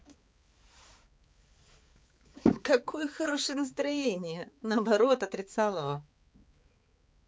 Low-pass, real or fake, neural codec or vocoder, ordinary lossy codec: none; fake; codec, 16 kHz, 4 kbps, X-Codec, HuBERT features, trained on balanced general audio; none